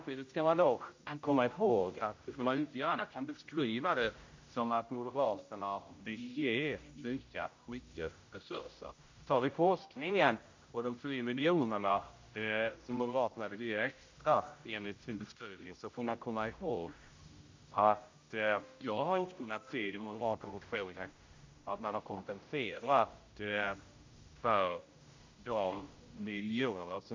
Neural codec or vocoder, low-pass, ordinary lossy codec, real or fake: codec, 16 kHz, 0.5 kbps, X-Codec, HuBERT features, trained on general audio; 7.2 kHz; MP3, 48 kbps; fake